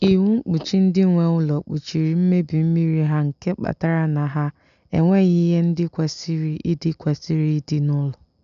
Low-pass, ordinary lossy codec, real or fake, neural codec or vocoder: 7.2 kHz; none; real; none